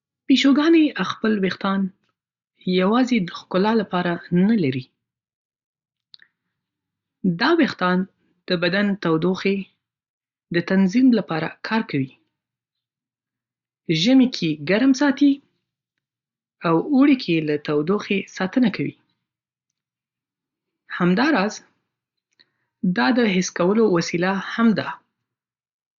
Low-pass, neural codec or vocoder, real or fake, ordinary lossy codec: 7.2 kHz; none; real; Opus, 64 kbps